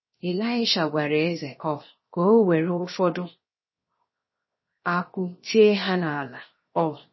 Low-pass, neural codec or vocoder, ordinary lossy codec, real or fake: 7.2 kHz; codec, 16 kHz, 0.7 kbps, FocalCodec; MP3, 24 kbps; fake